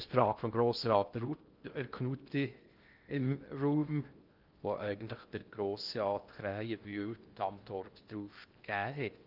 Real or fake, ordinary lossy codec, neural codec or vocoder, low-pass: fake; Opus, 24 kbps; codec, 16 kHz in and 24 kHz out, 0.6 kbps, FocalCodec, streaming, 2048 codes; 5.4 kHz